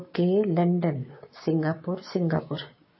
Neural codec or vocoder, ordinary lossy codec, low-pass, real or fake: codec, 16 kHz, 6 kbps, DAC; MP3, 24 kbps; 7.2 kHz; fake